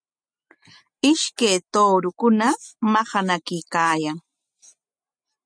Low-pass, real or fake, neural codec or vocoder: 9.9 kHz; real; none